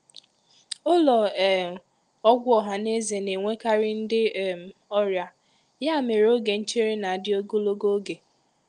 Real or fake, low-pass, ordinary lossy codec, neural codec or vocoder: real; 10.8 kHz; Opus, 32 kbps; none